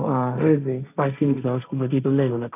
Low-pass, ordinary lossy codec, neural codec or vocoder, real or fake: 3.6 kHz; none; codec, 16 kHz, 1.1 kbps, Voila-Tokenizer; fake